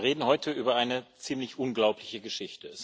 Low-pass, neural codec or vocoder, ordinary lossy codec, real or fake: none; none; none; real